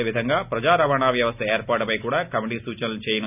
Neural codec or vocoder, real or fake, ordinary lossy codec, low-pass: none; real; none; 3.6 kHz